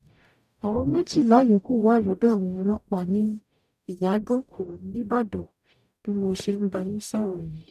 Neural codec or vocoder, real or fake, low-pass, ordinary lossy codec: codec, 44.1 kHz, 0.9 kbps, DAC; fake; 14.4 kHz; none